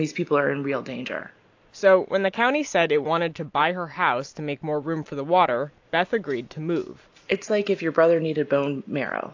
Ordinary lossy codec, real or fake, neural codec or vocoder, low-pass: AAC, 48 kbps; real; none; 7.2 kHz